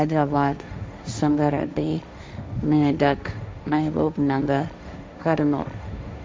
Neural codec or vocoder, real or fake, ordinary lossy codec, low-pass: codec, 16 kHz, 1.1 kbps, Voila-Tokenizer; fake; none; none